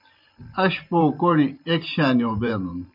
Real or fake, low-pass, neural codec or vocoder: fake; 5.4 kHz; vocoder, 44.1 kHz, 128 mel bands every 512 samples, BigVGAN v2